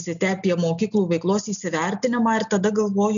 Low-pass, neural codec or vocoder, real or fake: 7.2 kHz; none; real